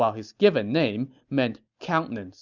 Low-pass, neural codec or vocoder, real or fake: 7.2 kHz; none; real